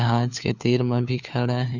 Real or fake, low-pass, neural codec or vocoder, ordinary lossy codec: fake; 7.2 kHz; codec, 16 kHz, 4 kbps, FunCodec, trained on LibriTTS, 50 frames a second; none